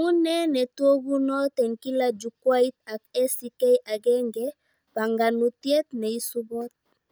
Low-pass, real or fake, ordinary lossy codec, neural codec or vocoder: none; real; none; none